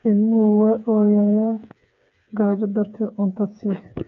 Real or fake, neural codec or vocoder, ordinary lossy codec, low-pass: fake; codec, 16 kHz, 4 kbps, FreqCodec, smaller model; MP3, 64 kbps; 7.2 kHz